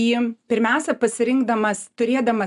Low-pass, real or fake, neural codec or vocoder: 10.8 kHz; real; none